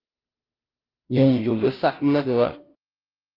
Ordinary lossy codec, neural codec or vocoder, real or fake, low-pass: Opus, 24 kbps; codec, 16 kHz, 0.5 kbps, FunCodec, trained on Chinese and English, 25 frames a second; fake; 5.4 kHz